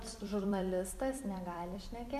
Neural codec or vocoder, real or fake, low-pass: vocoder, 44.1 kHz, 128 mel bands every 256 samples, BigVGAN v2; fake; 14.4 kHz